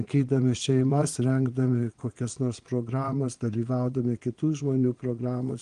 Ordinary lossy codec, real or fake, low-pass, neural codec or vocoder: Opus, 24 kbps; fake; 9.9 kHz; vocoder, 22.05 kHz, 80 mel bands, Vocos